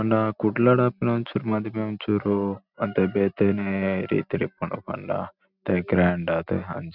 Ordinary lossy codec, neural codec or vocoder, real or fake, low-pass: none; none; real; 5.4 kHz